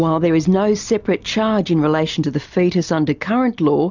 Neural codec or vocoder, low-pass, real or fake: none; 7.2 kHz; real